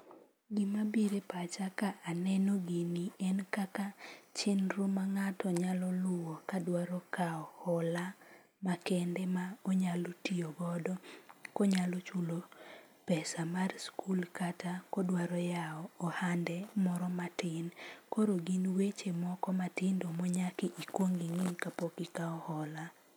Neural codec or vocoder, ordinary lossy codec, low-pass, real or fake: none; none; none; real